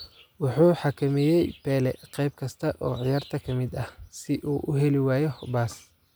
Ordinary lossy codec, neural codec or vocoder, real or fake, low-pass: none; none; real; none